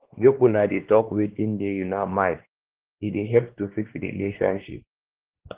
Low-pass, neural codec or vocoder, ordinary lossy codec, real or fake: 3.6 kHz; codec, 16 kHz, 2 kbps, X-Codec, WavLM features, trained on Multilingual LibriSpeech; Opus, 16 kbps; fake